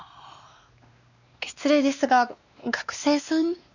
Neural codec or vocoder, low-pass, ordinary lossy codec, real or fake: codec, 16 kHz, 2 kbps, X-Codec, WavLM features, trained on Multilingual LibriSpeech; 7.2 kHz; none; fake